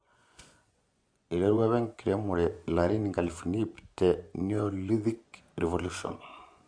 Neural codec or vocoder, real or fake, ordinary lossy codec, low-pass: vocoder, 24 kHz, 100 mel bands, Vocos; fake; MP3, 64 kbps; 9.9 kHz